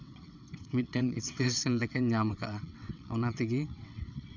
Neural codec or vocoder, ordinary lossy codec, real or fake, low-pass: vocoder, 22.05 kHz, 80 mel bands, WaveNeXt; none; fake; 7.2 kHz